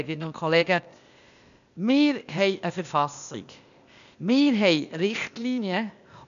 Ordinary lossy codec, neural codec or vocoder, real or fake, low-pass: none; codec, 16 kHz, 0.8 kbps, ZipCodec; fake; 7.2 kHz